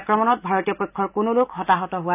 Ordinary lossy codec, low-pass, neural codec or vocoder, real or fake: none; 3.6 kHz; none; real